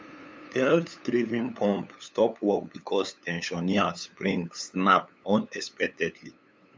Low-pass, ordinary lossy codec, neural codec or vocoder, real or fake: none; none; codec, 16 kHz, 8 kbps, FunCodec, trained on LibriTTS, 25 frames a second; fake